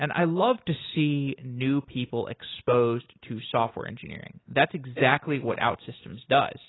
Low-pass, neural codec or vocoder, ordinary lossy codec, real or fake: 7.2 kHz; none; AAC, 16 kbps; real